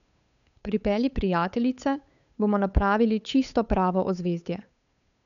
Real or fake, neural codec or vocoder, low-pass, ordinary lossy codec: fake; codec, 16 kHz, 8 kbps, FunCodec, trained on Chinese and English, 25 frames a second; 7.2 kHz; none